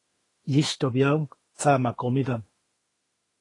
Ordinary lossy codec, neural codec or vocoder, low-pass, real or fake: AAC, 32 kbps; autoencoder, 48 kHz, 32 numbers a frame, DAC-VAE, trained on Japanese speech; 10.8 kHz; fake